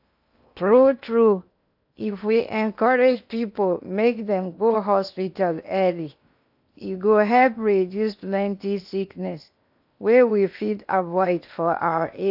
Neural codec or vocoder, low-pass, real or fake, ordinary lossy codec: codec, 16 kHz in and 24 kHz out, 0.8 kbps, FocalCodec, streaming, 65536 codes; 5.4 kHz; fake; AAC, 48 kbps